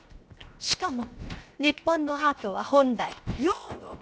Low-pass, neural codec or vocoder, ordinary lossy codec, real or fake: none; codec, 16 kHz, 0.7 kbps, FocalCodec; none; fake